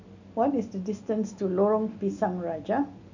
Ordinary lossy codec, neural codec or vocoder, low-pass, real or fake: none; none; 7.2 kHz; real